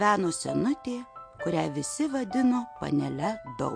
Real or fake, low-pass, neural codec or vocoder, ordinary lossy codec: real; 9.9 kHz; none; MP3, 48 kbps